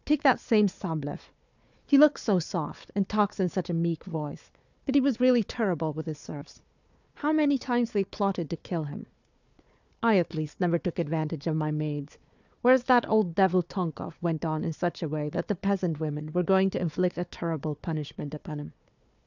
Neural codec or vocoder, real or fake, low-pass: codec, 16 kHz, 4 kbps, FunCodec, trained on Chinese and English, 50 frames a second; fake; 7.2 kHz